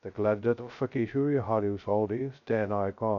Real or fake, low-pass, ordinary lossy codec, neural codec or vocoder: fake; 7.2 kHz; none; codec, 16 kHz, 0.2 kbps, FocalCodec